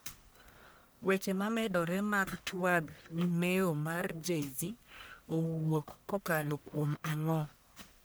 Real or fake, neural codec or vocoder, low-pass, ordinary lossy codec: fake; codec, 44.1 kHz, 1.7 kbps, Pupu-Codec; none; none